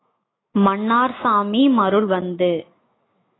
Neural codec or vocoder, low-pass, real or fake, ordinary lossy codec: autoencoder, 48 kHz, 128 numbers a frame, DAC-VAE, trained on Japanese speech; 7.2 kHz; fake; AAC, 16 kbps